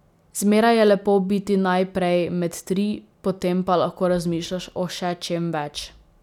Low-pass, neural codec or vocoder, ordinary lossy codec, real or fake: 19.8 kHz; none; none; real